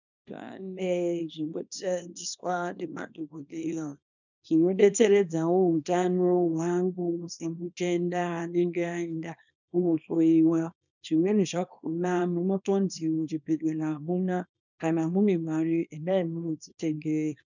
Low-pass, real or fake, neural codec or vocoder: 7.2 kHz; fake; codec, 24 kHz, 0.9 kbps, WavTokenizer, small release